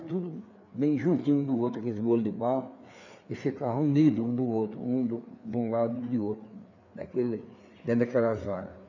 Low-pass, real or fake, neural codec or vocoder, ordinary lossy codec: 7.2 kHz; fake; codec, 16 kHz, 4 kbps, FreqCodec, larger model; none